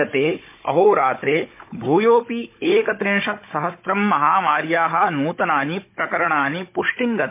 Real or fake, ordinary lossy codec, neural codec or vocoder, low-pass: fake; MP3, 24 kbps; codec, 16 kHz, 16 kbps, FunCodec, trained on Chinese and English, 50 frames a second; 3.6 kHz